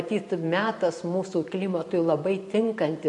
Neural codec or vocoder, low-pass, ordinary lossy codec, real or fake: vocoder, 44.1 kHz, 128 mel bands every 512 samples, BigVGAN v2; 10.8 kHz; MP3, 48 kbps; fake